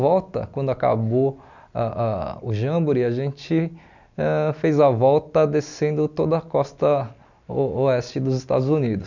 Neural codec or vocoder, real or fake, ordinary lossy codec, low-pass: none; real; none; 7.2 kHz